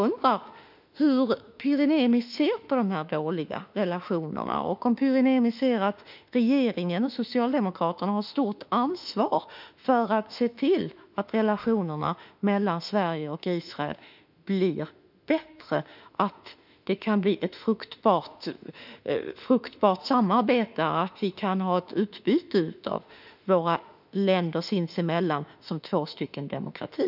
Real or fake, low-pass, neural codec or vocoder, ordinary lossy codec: fake; 5.4 kHz; autoencoder, 48 kHz, 32 numbers a frame, DAC-VAE, trained on Japanese speech; none